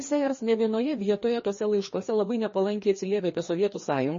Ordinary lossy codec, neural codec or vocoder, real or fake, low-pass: MP3, 32 kbps; codec, 16 kHz, 2 kbps, FreqCodec, larger model; fake; 7.2 kHz